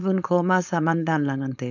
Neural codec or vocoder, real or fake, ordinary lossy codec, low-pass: codec, 16 kHz, 4.8 kbps, FACodec; fake; none; 7.2 kHz